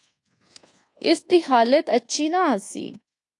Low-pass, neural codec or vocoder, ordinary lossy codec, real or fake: 10.8 kHz; codec, 24 kHz, 1.2 kbps, DualCodec; AAC, 48 kbps; fake